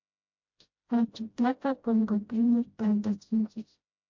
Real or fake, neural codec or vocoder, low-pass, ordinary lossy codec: fake; codec, 16 kHz, 0.5 kbps, FreqCodec, smaller model; 7.2 kHz; MP3, 48 kbps